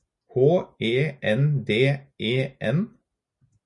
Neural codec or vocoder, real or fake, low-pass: none; real; 10.8 kHz